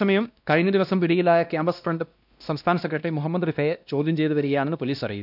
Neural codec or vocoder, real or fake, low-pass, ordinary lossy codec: codec, 16 kHz, 1 kbps, X-Codec, WavLM features, trained on Multilingual LibriSpeech; fake; 5.4 kHz; none